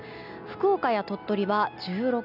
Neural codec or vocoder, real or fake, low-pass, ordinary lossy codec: none; real; 5.4 kHz; none